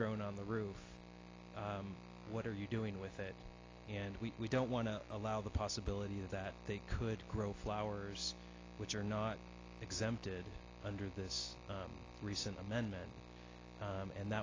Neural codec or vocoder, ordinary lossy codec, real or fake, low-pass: none; AAC, 32 kbps; real; 7.2 kHz